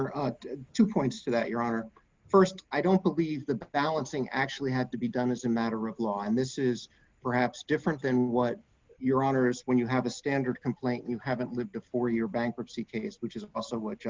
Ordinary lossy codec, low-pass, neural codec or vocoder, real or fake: Opus, 32 kbps; 7.2 kHz; none; real